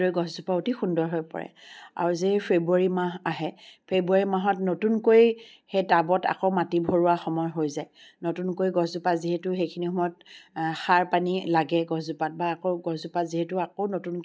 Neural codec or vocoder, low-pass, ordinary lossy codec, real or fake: none; none; none; real